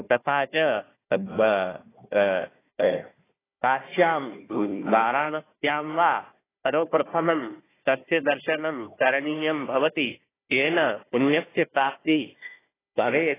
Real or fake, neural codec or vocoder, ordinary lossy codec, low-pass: fake; codec, 16 kHz, 1 kbps, FunCodec, trained on Chinese and English, 50 frames a second; AAC, 16 kbps; 3.6 kHz